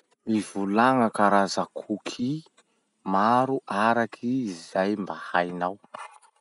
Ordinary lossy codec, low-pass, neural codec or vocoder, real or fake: none; 10.8 kHz; none; real